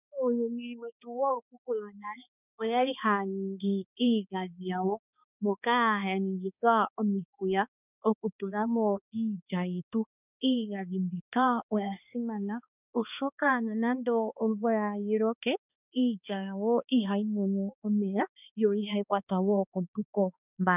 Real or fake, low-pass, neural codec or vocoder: fake; 3.6 kHz; codec, 16 kHz, 2 kbps, X-Codec, HuBERT features, trained on balanced general audio